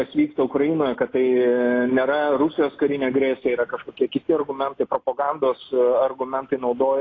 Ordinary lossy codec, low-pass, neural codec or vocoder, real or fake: AAC, 32 kbps; 7.2 kHz; none; real